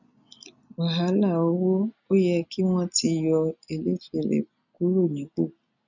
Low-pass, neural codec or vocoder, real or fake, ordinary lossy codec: 7.2 kHz; none; real; none